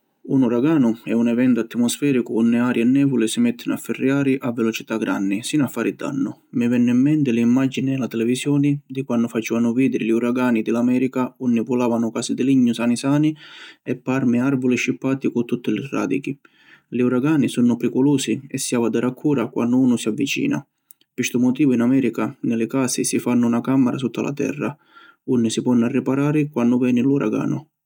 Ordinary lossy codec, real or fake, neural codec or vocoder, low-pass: none; real; none; 19.8 kHz